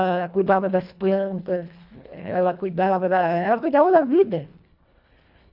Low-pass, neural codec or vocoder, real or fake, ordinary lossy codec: 5.4 kHz; codec, 24 kHz, 1.5 kbps, HILCodec; fake; none